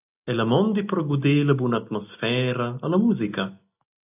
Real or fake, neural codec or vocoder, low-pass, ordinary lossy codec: real; none; 3.6 kHz; AAC, 32 kbps